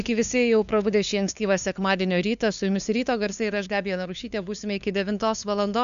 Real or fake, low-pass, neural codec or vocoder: fake; 7.2 kHz; codec, 16 kHz, 2 kbps, FunCodec, trained on LibriTTS, 25 frames a second